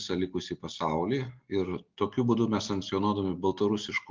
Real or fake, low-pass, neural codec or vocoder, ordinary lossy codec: real; 7.2 kHz; none; Opus, 32 kbps